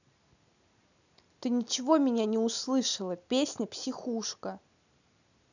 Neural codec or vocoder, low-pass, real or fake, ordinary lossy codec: none; 7.2 kHz; real; none